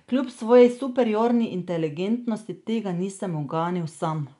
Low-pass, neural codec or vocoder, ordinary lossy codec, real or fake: 10.8 kHz; none; none; real